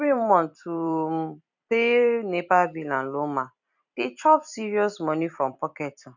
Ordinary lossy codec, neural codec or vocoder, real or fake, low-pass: none; vocoder, 44.1 kHz, 128 mel bands every 256 samples, BigVGAN v2; fake; 7.2 kHz